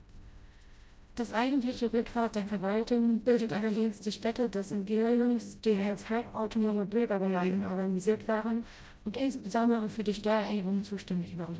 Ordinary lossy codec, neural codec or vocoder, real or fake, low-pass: none; codec, 16 kHz, 0.5 kbps, FreqCodec, smaller model; fake; none